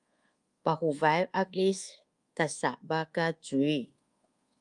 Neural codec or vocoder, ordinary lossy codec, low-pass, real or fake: codec, 24 kHz, 1.2 kbps, DualCodec; Opus, 32 kbps; 10.8 kHz; fake